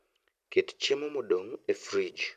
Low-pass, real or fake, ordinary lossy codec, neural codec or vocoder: 14.4 kHz; real; none; none